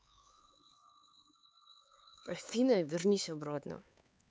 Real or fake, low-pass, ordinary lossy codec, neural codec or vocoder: fake; none; none; codec, 16 kHz, 4 kbps, X-Codec, HuBERT features, trained on LibriSpeech